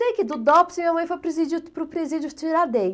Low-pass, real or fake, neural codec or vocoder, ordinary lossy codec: none; real; none; none